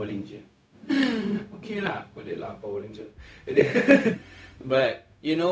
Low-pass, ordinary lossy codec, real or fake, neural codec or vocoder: none; none; fake; codec, 16 kHz, 0.4 kbps, LongCat-Audio-Codec